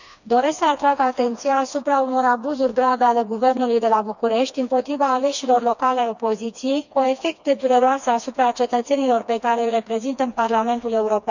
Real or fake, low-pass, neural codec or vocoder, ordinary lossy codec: fake; 7.2 kHz; codec, 16 kHz, 2 kbps, FreqCodec, smaller model; none